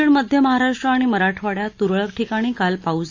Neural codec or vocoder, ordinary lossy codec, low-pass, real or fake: none; AAC, 48 kbps; 7.2 kHz; real